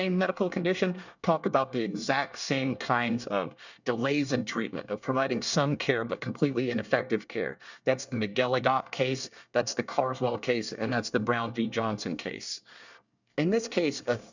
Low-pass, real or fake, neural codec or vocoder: 7.2 kHz; fake; codec, 24 kHz, 1 kbps, SNAC